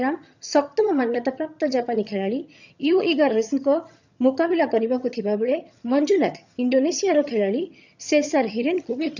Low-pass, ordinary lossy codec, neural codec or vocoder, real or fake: 7.2 kHz; none; vocoder, 22.05 kHz, 80 mel bands, HiFi-GAN; fake